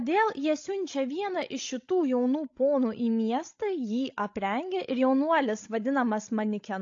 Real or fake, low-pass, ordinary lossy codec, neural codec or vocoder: fake; 7.2 kHz; AAC, 48 kbps; codec, 16 kHz, 16 kbps, FreqCodec, larger model